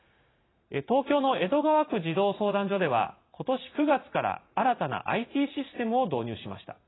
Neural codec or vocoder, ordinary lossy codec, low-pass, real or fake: vocoder, 44.1 kHz, 80 mel bands, Vocos; AAC, 16 kbps; 7.2 kHz; fake